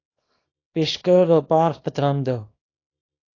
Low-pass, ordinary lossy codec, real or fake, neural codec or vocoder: 7.2 kHz; AAC, 32 kbps; fake; codec, 24 kHz, 0.9 kbps, WavTokenizer, small release